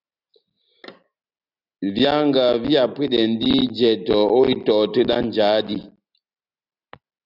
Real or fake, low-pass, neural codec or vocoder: real; 5.4 kHz; none